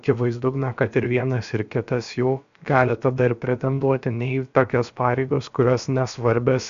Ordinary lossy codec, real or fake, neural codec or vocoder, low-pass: MP3, 64 kbps; fake; codec, 16 kHz, about 1 kbps, DyCAST, with the encoder's durations; 7.2 kHz